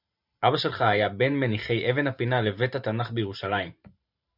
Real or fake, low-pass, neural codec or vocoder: fake; 5.4 kHz; vocoder, 44.1 kHz, 128 mel bands every 512 samples, BigVGAN v2